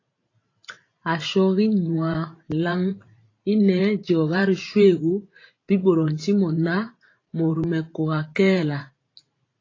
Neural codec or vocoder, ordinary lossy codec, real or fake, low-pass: vocoder, 44.1 kHz, 128 mel bands every 512 samples, BigVGAN v2; AAC, 32 kbps; fake; 7.2 kHz